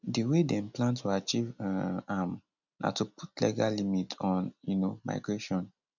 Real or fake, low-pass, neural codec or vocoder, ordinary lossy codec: real; 7.2 kHz; none; none